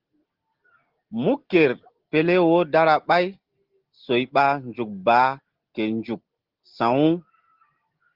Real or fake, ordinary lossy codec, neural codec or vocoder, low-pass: real; Opus, 16 kbps; none; 5.4 kHz